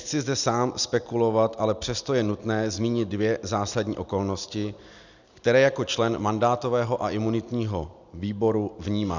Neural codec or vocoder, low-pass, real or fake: none; 7.2 kHz; real